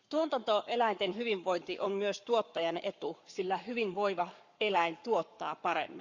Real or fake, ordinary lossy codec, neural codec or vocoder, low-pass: fake; Opus, 64 kbps; codec, 44.1 kHz, 7.8 kbps, Pupu-Codec; 7.2 kHz